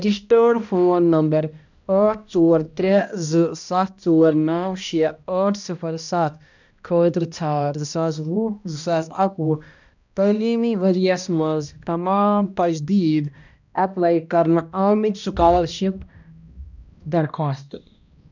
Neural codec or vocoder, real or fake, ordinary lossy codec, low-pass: codec, 16 kHz, 1 kbps, X-Codec, HuBERT features, trained on balanced general audio; fake; none; 7.2 kHz